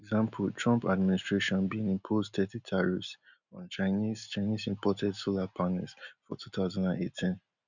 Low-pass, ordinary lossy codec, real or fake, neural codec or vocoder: 7.2 kHz; none; real; none